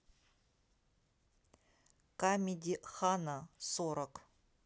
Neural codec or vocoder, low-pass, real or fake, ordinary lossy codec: none; none; real; none